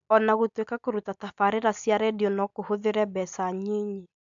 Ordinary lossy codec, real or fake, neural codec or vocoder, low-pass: MP3, 64 kbps; real; none; 7.2 kHz